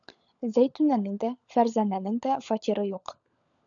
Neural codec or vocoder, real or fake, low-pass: codec, 16 kHz, 16 kbps, FunCodec, trained on LibriTTS, 50 frames a second; fake; 7.2 kHz